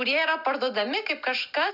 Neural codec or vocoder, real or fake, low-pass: none; real; 5.4 kHz